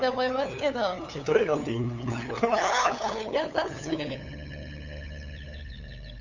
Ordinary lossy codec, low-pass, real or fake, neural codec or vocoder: none; 7.2 kHz; fake; codec, 16 kHz, 8 kbps, FunCodec, trained on LibriTTS, 25 frames a second